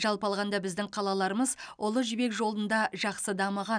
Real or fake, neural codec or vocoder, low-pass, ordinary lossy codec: real; none; 9.9 kHz; none